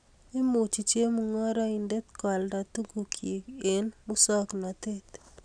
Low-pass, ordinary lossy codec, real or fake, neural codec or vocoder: 9.9 kHz; none; real; none